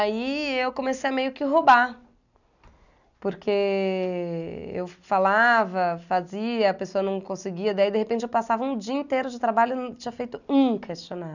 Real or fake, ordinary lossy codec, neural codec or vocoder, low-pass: real; none; none; 7.2 kHz